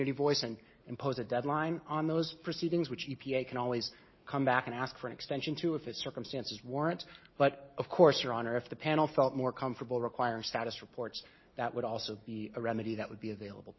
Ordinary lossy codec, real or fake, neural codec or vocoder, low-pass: MP3, 24 kbps; real; none; 7.2 kHz